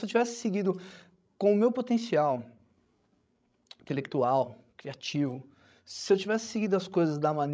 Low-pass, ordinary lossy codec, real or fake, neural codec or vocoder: none; none; fake; codec, 16 kHz, 16 kbps, FreqCodec, larger model